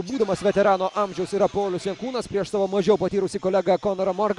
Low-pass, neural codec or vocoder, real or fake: 10.8 kHz; none; real